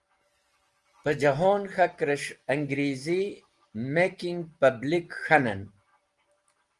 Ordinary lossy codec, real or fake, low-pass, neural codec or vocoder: Opus, 24 kbps; real; 10.8 kHz; none